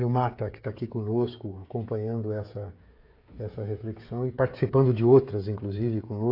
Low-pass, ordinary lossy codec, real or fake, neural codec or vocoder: 5.4 kHz; AAC, 32 kbps; fake; codec, 16 kHz, 16 kbps, FreqCodec, smaller model